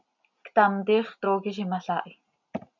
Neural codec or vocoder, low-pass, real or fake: none; 7.2 kHz; real